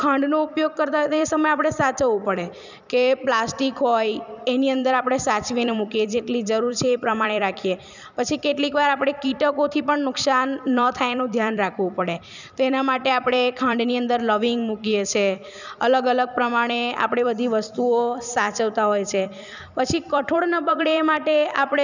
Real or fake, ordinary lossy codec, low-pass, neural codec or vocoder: fake; none; 7.2 kHz; vocoder, 44.1 kHz, 128 mel bands every 256 samples, BigVGAN v2